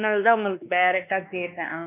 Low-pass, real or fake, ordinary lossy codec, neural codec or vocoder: 3.6 kHz; fake; none; codec, 16 kHz, 2 kbps, X-Codec, WavLM features, trained on Multilingual LibriSpeech